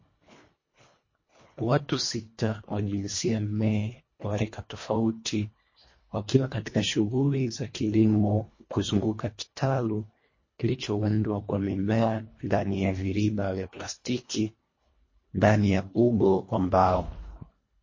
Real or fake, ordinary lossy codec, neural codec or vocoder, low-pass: fake; MP3, 32 kbps; codec, 24 kHz, 1.5 kbps, HILCodec; 7.2 kHz